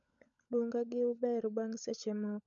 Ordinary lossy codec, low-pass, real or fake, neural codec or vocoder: none; 7.2 kHz; fake; codec, 16 kHz, 16 kbps, FunCodec, trained on LibriTTS, 50 frames a second